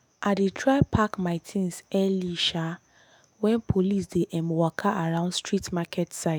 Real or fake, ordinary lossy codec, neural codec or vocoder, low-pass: fake; none; autoencoder, 48 kHz, 128 numbers a frame, DAC-VAE, trained on Japanese speech; none